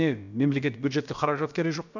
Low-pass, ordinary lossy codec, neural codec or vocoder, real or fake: 7.2 kHz; none; codec, 16 kHz, about 1 kbps, DyCAST, with the encoder's durations; fake